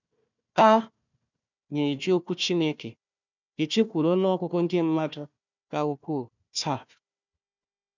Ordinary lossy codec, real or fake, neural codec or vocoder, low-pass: none; fake; codec, 16 kHz, 1 kbps, FunCodec, trained on Chinese and English, 50 frames a second; 7.2 kHz